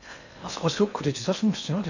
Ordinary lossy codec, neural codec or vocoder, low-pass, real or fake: none; codec, 16 kHz in and 24 kHz out, 0.8 kbps, FocalCodec, streaming, 65536 codes; 7.2 kHz; fake